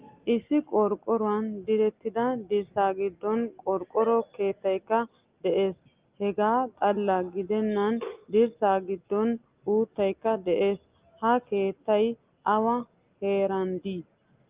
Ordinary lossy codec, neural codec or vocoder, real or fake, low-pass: Opus, 32 kbps; none; real; 3.6 kHz